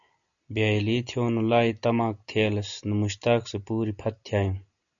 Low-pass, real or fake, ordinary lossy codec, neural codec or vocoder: 7.2 kHz; real; MP3, 96 kbps; none